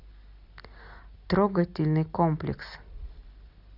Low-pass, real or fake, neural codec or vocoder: 5.4 kHz; real; none